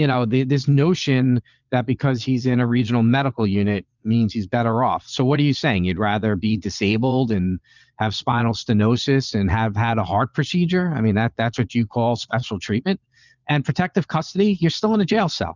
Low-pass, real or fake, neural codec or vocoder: 7.2 kHz; fake; vocoder, 22.05 kHz, 80 mel bands, Vocos